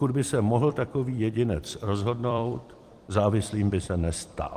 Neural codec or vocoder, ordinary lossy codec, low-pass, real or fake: vocoder, 44.1 kHz, 128 mel bands every 256 samples, BigVGAN v2; Opus, 24 kbps; 14.4 kHz; fake